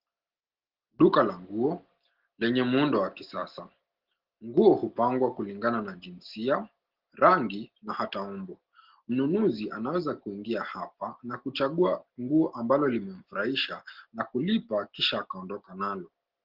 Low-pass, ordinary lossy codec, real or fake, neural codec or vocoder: 5.4 kHz; Opus, 16 kbps; real; none